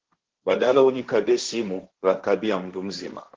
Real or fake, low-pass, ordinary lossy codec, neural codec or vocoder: fake; 7.2 kHz; Opus, 16 kbps; codec, 16 kHz, 1.1 kbps, Voila-Tokenizer